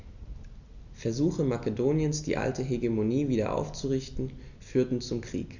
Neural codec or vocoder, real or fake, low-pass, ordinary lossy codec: none; real; 7.2 kHz; none